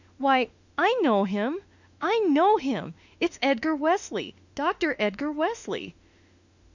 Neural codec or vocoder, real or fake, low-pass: autoencoder, 48 kHz, 32 numbers a frame, DAC-VAE, trained on Japanese speech; fake; 7.2 kHz